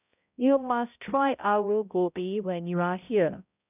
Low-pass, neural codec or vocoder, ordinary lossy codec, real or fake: 3.6 kHz; codec, 16 kHz, 0.5 kbps, X-Codec, HuBERT features, trained on balanced general audio; none; fake